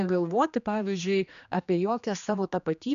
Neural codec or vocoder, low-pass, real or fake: codec, 16 kHz, 2 kbps, X-Codec, HuBERT features, trained on general audio; 7.2 kHz; fake